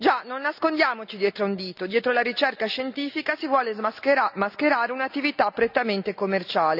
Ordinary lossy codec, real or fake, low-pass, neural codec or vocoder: none; real; 5.4 kHz; none